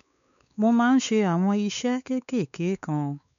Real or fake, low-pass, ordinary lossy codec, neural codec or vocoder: fake; 7.2 kHz; none; codec, 16 kHz, 4 kbps, X-Codec, WavLM features, trained on Multilingual LibriSpeech